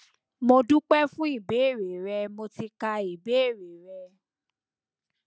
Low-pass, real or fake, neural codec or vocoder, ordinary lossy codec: none; real; none; none